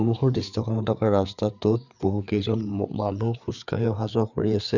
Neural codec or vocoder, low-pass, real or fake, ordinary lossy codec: codec, 16 kHz, 4 kbps, FreqCodec, larger model; 7.2 kHz; fake; none